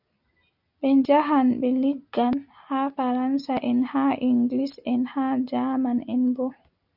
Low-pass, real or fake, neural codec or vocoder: 5.4 kHz; real; none